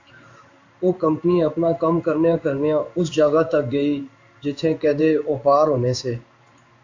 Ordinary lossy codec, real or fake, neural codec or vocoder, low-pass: AAC, 48 kbps; fake; codec, 16 kHz in and 24 kHz out, 1 kbps, XY-Tokenizer; 7.2 kHz